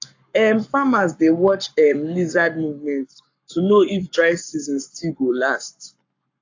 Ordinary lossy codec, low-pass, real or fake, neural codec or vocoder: AAC, 48 kbps; 7.2 kHz; fake; codec, 44.1 kHz, 7.8 kbps, Pupu-Codec